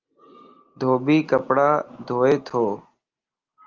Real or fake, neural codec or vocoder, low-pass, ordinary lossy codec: real; none; 7.2 kHz; Opus, 32 kbps